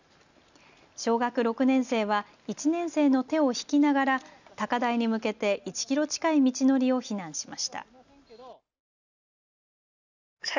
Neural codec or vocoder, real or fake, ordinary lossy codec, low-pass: none; real; none; 7.2 kHz